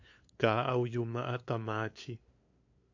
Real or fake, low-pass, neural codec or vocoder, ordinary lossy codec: fake; 7.2 kHz; codec, 16 kHz, 2 kbps, FunCodec, trained on LibriTTS, 25 frames a second; AAC, 48 kbps